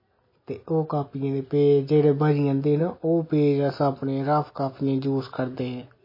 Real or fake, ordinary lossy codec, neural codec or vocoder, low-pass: real; MP3, 24 kbps; none; 5.4 kHz